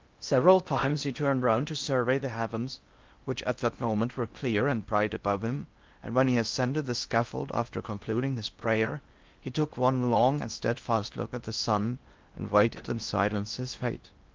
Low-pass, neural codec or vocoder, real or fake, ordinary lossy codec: 7.2 kHz; codec, 16 kHz in and 24 kHz out, 0.6 kbps, FocalCodec, streaming, 2048 codes; fake; Opus, 32 kbps